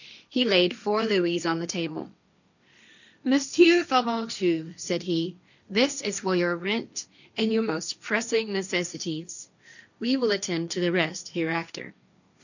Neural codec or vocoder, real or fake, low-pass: codec, 16 kHz, 1.1 kbps, Voila-Tokenizer; fake; 7.2 kHz